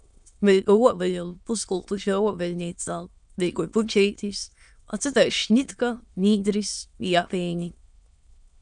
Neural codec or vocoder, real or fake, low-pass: autoencoder, 22.05 kHz, a latent of 192 numbers a frame, VITS, trained on many speakers; fake; 9.9 kHz